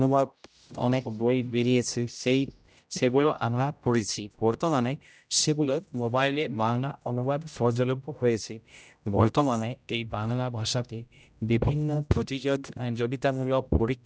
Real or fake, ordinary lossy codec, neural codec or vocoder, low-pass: fake; none; codec, 16 kHz, 0.5 kbps, X-Codec, HuBERT features, trained on general audio; none